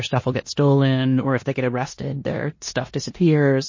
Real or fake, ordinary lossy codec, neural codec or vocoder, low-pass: fake; MP3, 32 kbps; codec, 16 kHz in and 24 kHz out, 0.9 kbps, LongCat-Audio-Codec, four codebook decoder; 7.2 kHz